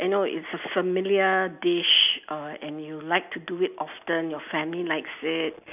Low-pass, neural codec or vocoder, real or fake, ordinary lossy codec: 3.6 kHz; none; real; none